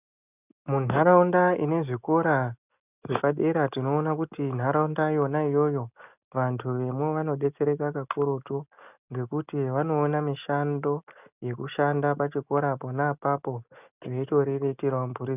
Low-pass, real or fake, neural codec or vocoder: 3.6 kHz; real; none